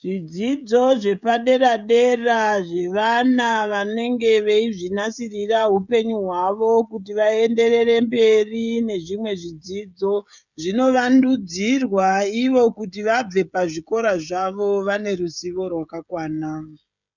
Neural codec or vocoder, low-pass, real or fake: codec, 16 kHz, 16 kbps, FreqCodec, smaller model; 7.2 kHz; fake